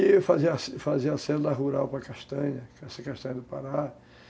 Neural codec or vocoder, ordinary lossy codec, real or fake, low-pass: none; none; real; none